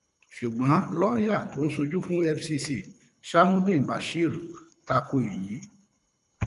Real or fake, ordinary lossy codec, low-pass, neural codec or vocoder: fake; MP3, 96 kbps; 10.8 kHz; codec, 24 kHz, 3 kbps, HILCodec